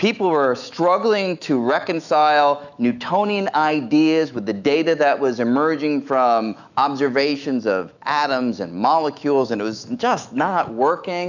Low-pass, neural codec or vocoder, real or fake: 7.2 kHz; autoencoder, 48 kHz, 128 numbers a frame, DAC-VAE, trained on Japanese speech; fake